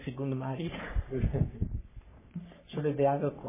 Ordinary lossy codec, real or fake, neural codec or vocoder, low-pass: MP3, 16 kbps; fake; codec, 44.1 kHz, 3.4 kbps, Pupu-Codec; 3.6 kHz